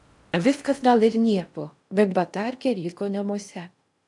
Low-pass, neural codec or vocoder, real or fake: 10.8 kHz; codec, 16 kHz in and 24 kHz out, 0.6 kbps, FocalCodec, streaming, 4096 codes; fake